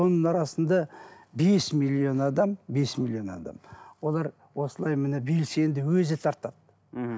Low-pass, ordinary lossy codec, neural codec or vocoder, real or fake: none; none; none; real